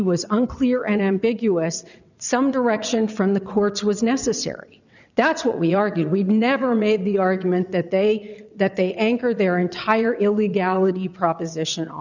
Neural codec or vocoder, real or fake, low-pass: vocoder, 22.05 kHz, 80 mel bands, WaveNeXt; fake; 7.2 kHz